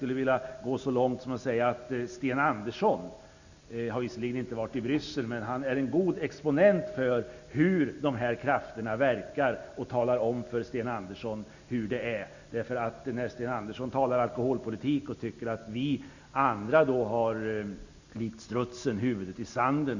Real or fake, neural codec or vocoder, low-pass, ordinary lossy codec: real; none; 7.2 kHz; none